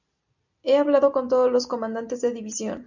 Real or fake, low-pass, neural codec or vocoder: real; 7.2 kHz; none